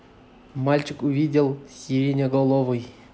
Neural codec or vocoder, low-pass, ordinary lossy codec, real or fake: none; none; none; real